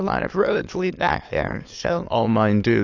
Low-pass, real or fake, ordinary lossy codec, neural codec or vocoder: 7.2 kHz; fake; AAC, 48 kbps; autoencoder, 22.05 kHz, a latent of 192 numbers a frame, VITS, trained on many speakers